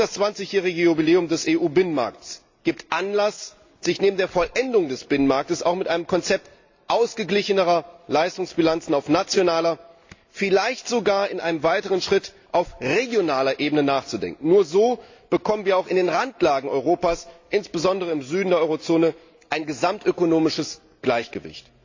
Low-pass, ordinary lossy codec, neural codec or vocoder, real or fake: 7.2 kHz; AAC, 48 kbps; none; real